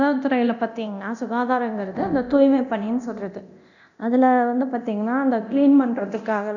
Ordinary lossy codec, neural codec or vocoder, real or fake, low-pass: none; codec, 24 kHz, 0.9 kbps, DualCodec; fake; 7.2 kHz